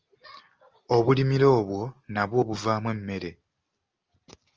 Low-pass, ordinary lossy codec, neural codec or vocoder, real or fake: 7.2 kHz; Opus, 24 kbps; none; real